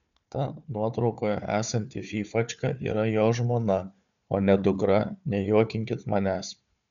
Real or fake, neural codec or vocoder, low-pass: fake; codec, 16 kHz, 4 kbps, FunCodec, trained on Chinese and English, 50 frames a second; 7.2 kHz